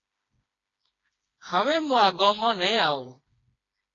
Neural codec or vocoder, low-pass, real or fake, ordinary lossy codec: codec, 16 kHz, 2 kbps, FreqCodec, smaller model; 7.2 kHz; fake; AAC, 32 kbps